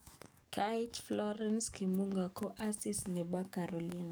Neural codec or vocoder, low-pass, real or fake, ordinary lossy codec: codec, 44.1 kHz, 7.8 kbps, DAC; none; fake; none